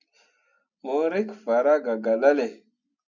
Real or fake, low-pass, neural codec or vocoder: real; 7.2 kHz; none